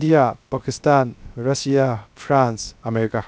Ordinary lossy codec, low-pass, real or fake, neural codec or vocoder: none; none; fake; codec, 16 kHz, 0.3 kbps, FocalCodec